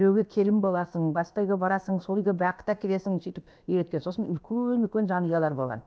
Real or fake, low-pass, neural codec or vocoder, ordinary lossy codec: fake; none; codec, 16 kHz, about 1 kbps, DyCAST, with the encoder's durations; none